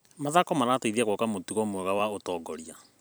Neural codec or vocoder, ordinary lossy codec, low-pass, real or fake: vocoder, 44.1 kHz, 128 mel bands every 256 samples, BigVGAN v2; none; none; fake